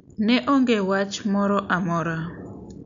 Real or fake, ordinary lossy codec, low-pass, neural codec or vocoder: real; none; 7.2 kHz; none